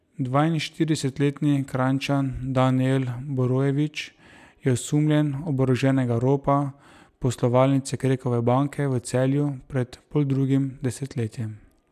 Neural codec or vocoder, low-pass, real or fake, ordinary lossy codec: none; 14.4 kHz; real; none